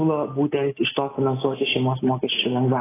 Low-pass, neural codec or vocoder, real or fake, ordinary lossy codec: 3.6 kHz; none; real; AAC, 16 kbps